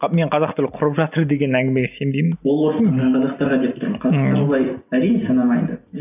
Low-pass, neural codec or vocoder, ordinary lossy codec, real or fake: 3.6 kHz; none; none; real